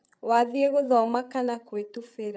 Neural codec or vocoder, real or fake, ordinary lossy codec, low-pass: codec, 16 kHz, 8 kbps, FreqCodec, larger model; fake; none; none